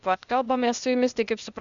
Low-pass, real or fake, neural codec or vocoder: 7.2 kHz; fake; codec, 16 kHz, 0.8 kbps, ZipCodec